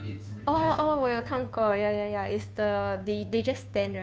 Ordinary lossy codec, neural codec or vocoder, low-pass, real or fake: none; codec, 16 kHz, 2 kbps, FunCodec, trained on Chinese and English, 25 frames a second; none; fake